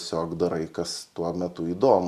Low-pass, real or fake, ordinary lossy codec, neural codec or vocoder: 14.4 kHz; real; Opus, 64 kbps; none